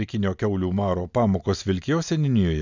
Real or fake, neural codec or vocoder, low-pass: real; none; 7.2 kHz